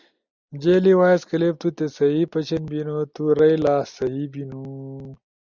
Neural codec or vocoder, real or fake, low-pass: none; real; 7.2 kHz